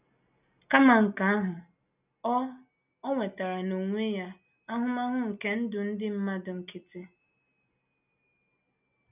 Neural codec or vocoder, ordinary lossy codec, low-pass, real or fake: none; none; 3.6 kHz; real